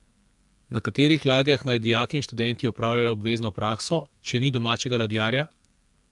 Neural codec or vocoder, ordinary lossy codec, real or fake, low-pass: codec, 44.1 kHz, 2.6 kbps, SNAC; none; fake; 10.8 kHz